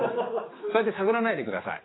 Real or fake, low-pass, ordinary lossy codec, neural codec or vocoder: fake; 7.2 kHz; AAC, 16 kbps; codec, 16 kHz, 4 kbps, X-Codec, HuBERT features, trained on general audio